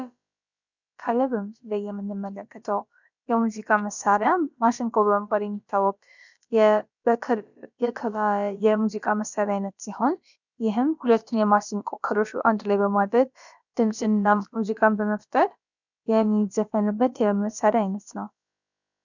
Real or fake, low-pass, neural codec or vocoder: fake; 7.2 kHz; codec, 16 kHz, about 1 kbps, DyCAST, with the encoder's durations